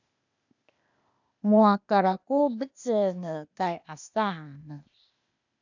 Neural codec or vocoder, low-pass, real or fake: codec, 16 kHz, 0.8 kbps, ZipCodec; 7.2 kHz; fake